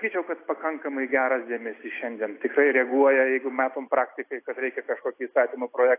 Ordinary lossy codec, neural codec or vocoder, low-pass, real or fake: AAC, 24 kbps; none; 3.6 kHz; real